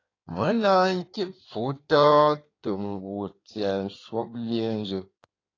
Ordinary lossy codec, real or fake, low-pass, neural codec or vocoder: AAC, 32 kbps; fake; 7.2 kHz; codec, 16 kHz in and 24 kHz out, 1.1 kbps, FireRedTTS-2 codec